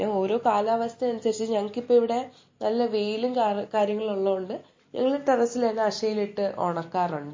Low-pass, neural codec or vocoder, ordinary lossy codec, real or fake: 7.2 kHz; none; MP3, 32 kbps; real